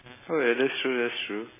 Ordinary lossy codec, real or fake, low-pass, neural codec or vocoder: MP3, 16 kbps; real; 3.6 kHz; none